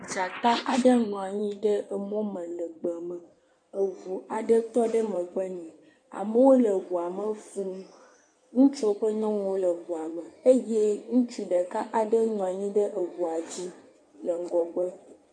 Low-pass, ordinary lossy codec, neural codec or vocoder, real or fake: 9.9 kHz; MP3, 48 kbps; codec, 16 kHz in and 24 kHz out, 2.2 kbps, FireRedTTS-2 codec; fake